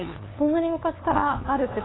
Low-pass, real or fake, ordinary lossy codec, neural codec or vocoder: 7.2 kHz; fake; AAC, 16 kbps; codec, 16 kHz, 2 kbps, FunCodec, trained on LibriTTS, 25 frames a second